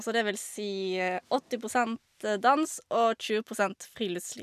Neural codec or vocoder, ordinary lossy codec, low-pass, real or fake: none; none; 14.4 kHz; real